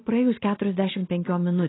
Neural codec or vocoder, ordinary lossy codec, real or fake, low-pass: none; AAC, 16 kbps; real; 7.2 kHz